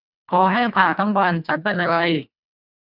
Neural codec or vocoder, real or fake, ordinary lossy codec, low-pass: codec, 24 kHz, 1.5 kbps, HILCodec; fake; none; 5.4 kHz